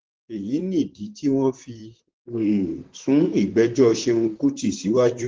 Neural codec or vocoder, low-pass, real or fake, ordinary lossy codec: vocoder, 44.1 kHz, 128 mel bands, Pupu-Vocoder; 7.2 kHz; fake; Opus, 16 kbps